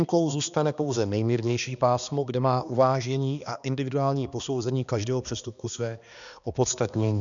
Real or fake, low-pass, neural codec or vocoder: fake; 7.2 kHz; codec, 16 kHz, 2 kbps, X-Codec, HuBERT features, trained on balanced general audio